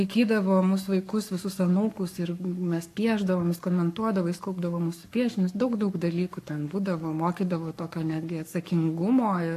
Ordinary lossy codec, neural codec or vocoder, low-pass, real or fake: AAC, 64 kbps; codec, 44.1 kHz, 7.8 kbps, Pupu-Codec; 14.4 kHz; fake